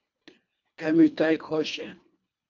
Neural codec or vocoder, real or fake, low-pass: codec, 24 kHz, 1.5 kbps, HILCodec; fake; 7.2 kHz